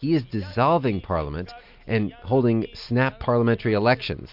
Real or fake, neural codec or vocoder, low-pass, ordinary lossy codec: real; none; 5.4 kHz; MP3, 48 kbps